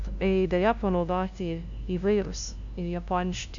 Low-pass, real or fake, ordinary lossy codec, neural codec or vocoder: 7.2 kHz; fake; AAC, 64 kbps; codec, 16 kHz, 0.5 kbps, FunCodec, trained on LibriTTS, 25 frames a second